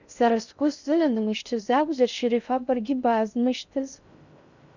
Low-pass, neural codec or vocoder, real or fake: 7.2 kHz; codec, 16 kHz in and 24 kHz out, 0.6 kbps, FocalCodec, streaming, 2048 codes; fake